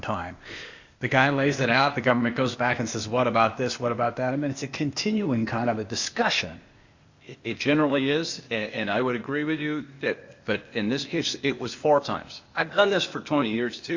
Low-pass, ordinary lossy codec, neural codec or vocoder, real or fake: 7.2 kHz; Opus, 64 kbps; codec, 16 kHz, 0.8 kbps, ZipCodec; fake